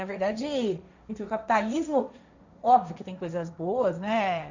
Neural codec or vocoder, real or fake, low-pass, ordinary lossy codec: codec, 16 kHz, 1.1 kbps, Voila-Tokenizer; fake; 7.2 kHz; none